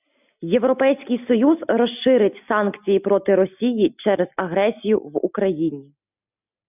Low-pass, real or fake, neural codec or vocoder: 3.6 kHz; real; none